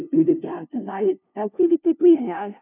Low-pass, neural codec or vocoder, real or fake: 3.6 kHz; codec, 16 kHz, 0.5 kbps, FunCodec, trained on LibriTTS, 25 frames a second; fake